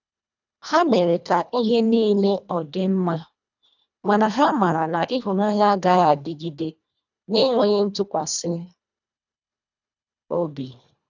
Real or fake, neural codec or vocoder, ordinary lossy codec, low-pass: fake; codec, 24 kHz, 1.5 kbps, HILCodec; none; 7.2 kHz